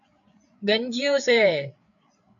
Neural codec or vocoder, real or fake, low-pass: codec, 16 kHz, 8 kbps, FreqCodec, larger model; fake; 7.2 kHz